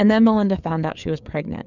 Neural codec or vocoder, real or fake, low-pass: codec, 16 kHz, 4 kbps, FreqCodec, larger model; fake; 7.2 kHz